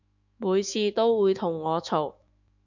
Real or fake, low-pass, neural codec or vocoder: fake; 7.2 kHz; autoencoder, 48 kHz, 128 numbers a frame, DAC-VAE, trained on Japanese speech